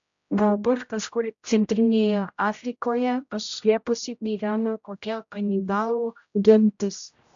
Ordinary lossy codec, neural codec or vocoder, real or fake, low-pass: AAC, 64 kbps; codec, 16 kHz, 0.5 kbps, X-Codec, HuBERT features, trained on general audio; fake; 7.2 kHz